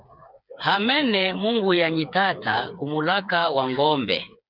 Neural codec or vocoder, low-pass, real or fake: codec, 16 kHz, 4 kbps, FreqCodec, smaller model; 5.4 kHz; fake